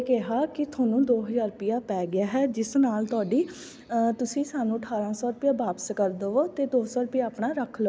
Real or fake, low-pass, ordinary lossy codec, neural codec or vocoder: real; none; none; none